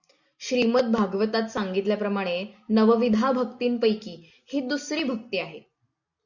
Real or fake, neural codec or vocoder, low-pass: real; none; 7.2 kHz